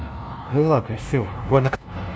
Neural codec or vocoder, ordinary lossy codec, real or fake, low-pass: codec, 16 kHz, 0.5 kbps, FunCodec, trained on LibriTTS, 25 frames a second; none; fake; none